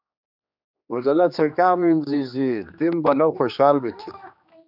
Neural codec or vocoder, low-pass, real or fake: codec, 16 kHz, 2 kbps, X-Codec, HuBERT features, trained on general audio; 5.4 kHz; fake